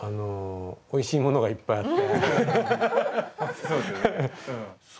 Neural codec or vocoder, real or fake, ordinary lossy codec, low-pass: none; real; none; none